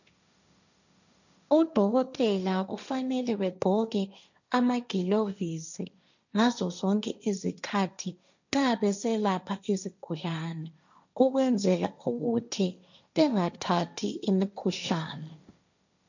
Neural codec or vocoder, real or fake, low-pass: codec, 16 kHz, 1.1 kbps, Voila-Tokenizer; fake; 7.2 kHz